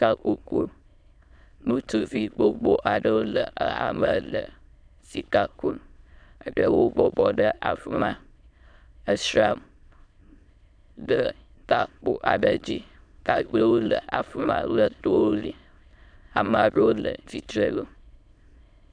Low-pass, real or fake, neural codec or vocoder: 9.9 kHz; fake; autoencoder, 22.05 kHz, a latent of 192 numbers a frame, VITS, trained on many speakers